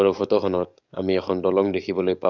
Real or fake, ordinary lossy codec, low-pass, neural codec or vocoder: fake; none; 7.2 kHz; codec, 44.1 kHz, 7.8 kbps, DAC